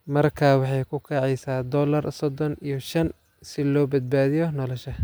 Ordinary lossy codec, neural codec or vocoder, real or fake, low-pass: none; none; real; none